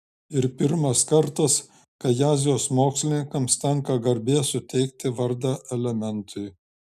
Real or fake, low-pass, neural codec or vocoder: real; 14.4 kHz; none